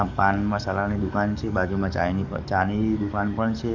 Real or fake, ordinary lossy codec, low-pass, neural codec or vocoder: fake; Opus, 64 kbps; 7.2 kHz; codec, 44.1 kHz, 7.8 kbps, DAC